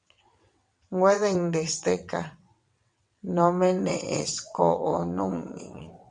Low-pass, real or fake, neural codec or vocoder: 9.9 kHz; fake; vocoder, 22.05 kHz, 80 mel bands, WaveNeXt